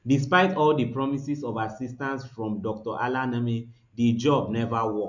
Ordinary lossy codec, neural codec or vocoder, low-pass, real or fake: none; none; 7.2 kHz; real